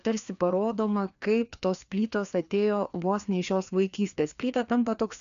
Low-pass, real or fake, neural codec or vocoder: 7.2 kHz; fake; codec, 16 kHz, 2 kbps, FreqCodec, larger model